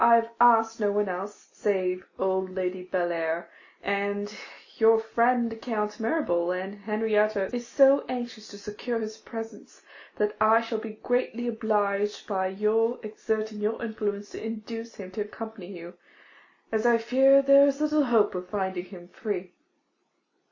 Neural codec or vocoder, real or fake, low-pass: none; real; 7.2 kHz